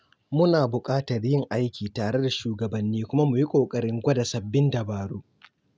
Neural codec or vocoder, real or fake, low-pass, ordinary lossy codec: none; real; none; none